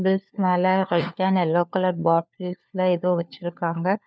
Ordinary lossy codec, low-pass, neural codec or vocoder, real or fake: none; none; codec, 16 kHz, 4 kbps, FunCodec, trained on LibriTTS, 50 frames a second; fake